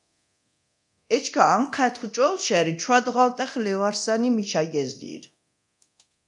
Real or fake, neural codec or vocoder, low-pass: fake; codec, 24 kHz, 0.9 kbps, DualCodec; 10.8 kHz